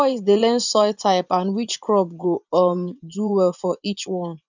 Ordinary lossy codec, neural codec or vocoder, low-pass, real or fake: none; vocoder, 24 kHz, 100 mel bands, Vocos; 7.2 kHz; fake